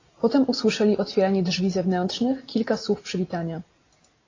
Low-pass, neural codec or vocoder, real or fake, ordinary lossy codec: 7.2 kHz; none; real; AAC, 32 kbps